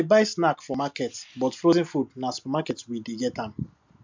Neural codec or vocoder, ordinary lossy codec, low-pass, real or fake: none; MP3, 64 kbps; 7.2 kHz; real